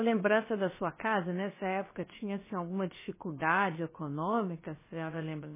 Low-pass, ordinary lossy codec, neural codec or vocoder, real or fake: 3.6 kHz; MP3, 16 kbps; codec, 16 kHz, about 1 kbps, DyCAST, with the encoder's durations; fake